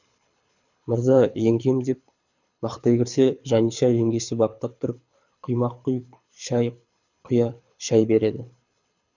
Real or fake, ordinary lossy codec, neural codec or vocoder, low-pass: fake; none; codec, 24 kHz, 6 kbps, HILCodec; 7.2 kHz